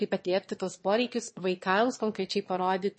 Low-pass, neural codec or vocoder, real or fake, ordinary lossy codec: 9.9 kHz; autoencoder, 22.05 kHz, a latent of 192 numbers a frame, VITS, trained on one speaker; fake; MP3, 32 kbps